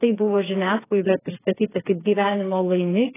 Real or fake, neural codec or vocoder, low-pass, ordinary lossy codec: fake; codec, 16 kHz, 4 kbps, FreqCodec, smaller model; 3.6 kHz; AAC, 16 kbps